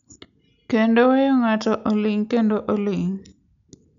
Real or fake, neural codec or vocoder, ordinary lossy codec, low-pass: real; none; none; 7.2 kHz